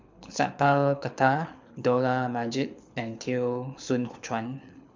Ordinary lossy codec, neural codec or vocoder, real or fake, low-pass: MP3, 64 kbps; codec, 24 kHz, 6 kbps, HILCodec; fake; 7.2 kHz